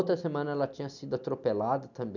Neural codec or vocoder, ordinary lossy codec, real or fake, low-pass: none; none; real; 7.2 kHz